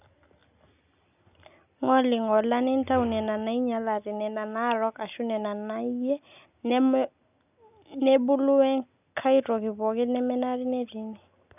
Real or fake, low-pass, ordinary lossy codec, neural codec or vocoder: real; 3.6 kHz; none; none